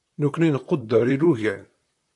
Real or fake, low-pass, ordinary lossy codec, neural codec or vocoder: fake; 10.8 kHz; AAC, 64 kbps; vocoder, 44.1 kHz, 128 mel bands, Pupu-Vocoder